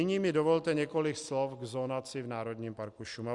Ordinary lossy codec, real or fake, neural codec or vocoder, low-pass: Opus, 64 kbps; real; none; 10.8 kHz